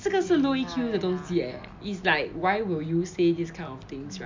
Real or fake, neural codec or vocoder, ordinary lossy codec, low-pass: real; none; MP3, 64 kbps; 7.2 kHz